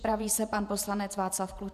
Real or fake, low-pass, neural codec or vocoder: fake; 14.4 kHz; vocoder, 48 kHz, 128 mel bands, Vocos